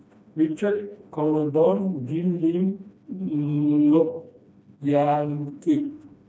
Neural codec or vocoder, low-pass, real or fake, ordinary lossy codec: codec, 16 kHz, 1 kbps, FreqCodec, smaller model; none; fake; none